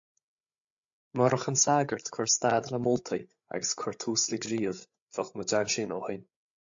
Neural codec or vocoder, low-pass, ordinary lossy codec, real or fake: codec, 16 kHz, 8 kbps, FreqCodec, larger model; 7.2 kHz; AAC, 64 kbps; fake